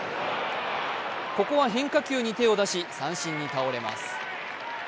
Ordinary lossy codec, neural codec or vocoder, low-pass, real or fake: none; none; none; real